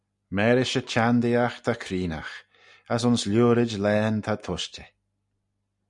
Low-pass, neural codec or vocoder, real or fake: 10.8 kHz; none; real